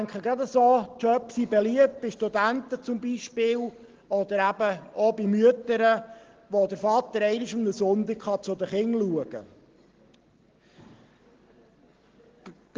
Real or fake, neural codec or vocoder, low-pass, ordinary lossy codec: real; none; 7.2 kHz; Opus, 16 kbps